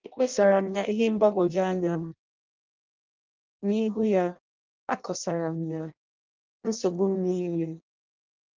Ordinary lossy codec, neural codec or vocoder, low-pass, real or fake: Opus, 24 kbps; codec, 16 kHz in and 24 kHz out, 0.6 kbps, FireRedTTS-2 codec; 7.2 kHz; fake